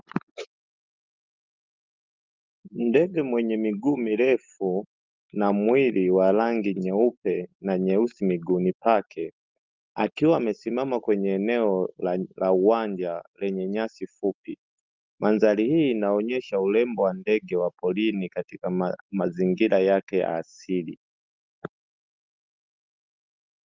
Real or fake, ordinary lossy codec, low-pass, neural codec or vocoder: real; Opus, 32 kbps; 7.2 kHz; none